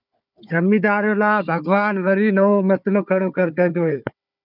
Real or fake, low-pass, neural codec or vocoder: fake; 5.4 kHz; codec, 16 kHz, 4 kbps, FunCodec, trained on Chinese and English, 50 frames a second